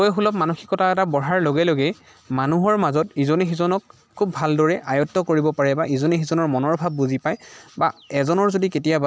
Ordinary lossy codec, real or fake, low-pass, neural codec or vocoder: none; real; none; none